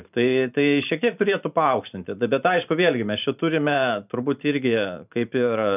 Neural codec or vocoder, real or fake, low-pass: vocoder, 44.1 kHz, 128 mel bands every 512 samples, BigVGAN v2; fake; 3.6 kHz